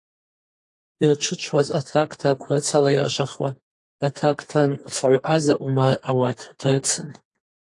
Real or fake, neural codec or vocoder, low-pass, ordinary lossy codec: fake; codec, 32 kHz, 1.9 kbps, SNAC; 10.8 kHz; AAC, 48 kbps